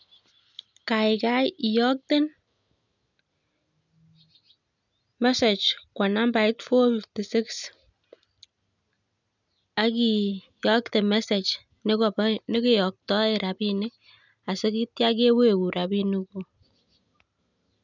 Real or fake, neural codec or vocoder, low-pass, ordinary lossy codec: real; none; 7.2 kHz; none